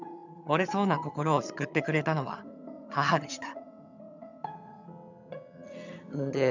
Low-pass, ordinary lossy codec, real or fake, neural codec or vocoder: 7.2 kHz; none; fake; vocoder, 22.05 kHz, 80 mel bands, HiFi-GAN